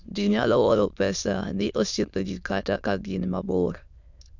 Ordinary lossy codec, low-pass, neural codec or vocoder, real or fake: none; 7.2 kHz; autoencoder, 22.05 kHz, a latent of 192 numbers a frame, VITS, trained on many speakers; fake